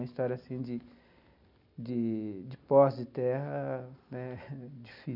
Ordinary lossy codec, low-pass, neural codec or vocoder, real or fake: none; 5.4 kHz; none; real